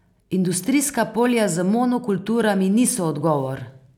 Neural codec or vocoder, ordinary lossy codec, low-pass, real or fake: none; none; 19.8 kHz; real